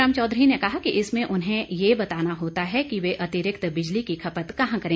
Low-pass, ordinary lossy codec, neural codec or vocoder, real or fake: none; none; none; real